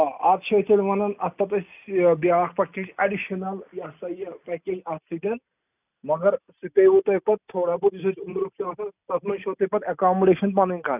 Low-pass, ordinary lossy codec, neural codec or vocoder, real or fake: 3.6 kHz; none; none; real